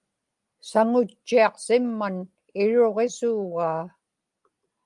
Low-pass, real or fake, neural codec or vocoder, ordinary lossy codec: 10.8 kHz; real; none; Opus, 32 kbps